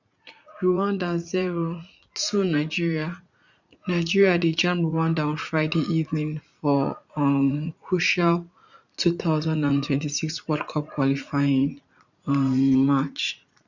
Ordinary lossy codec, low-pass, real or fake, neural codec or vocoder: none; 7.2 kHz; fake; vocoder, 44.1 kHz, 80 mel bands, Vocos